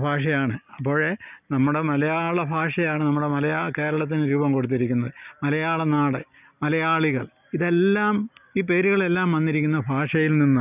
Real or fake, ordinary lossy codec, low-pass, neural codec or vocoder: real; none; 3.6 kHz; none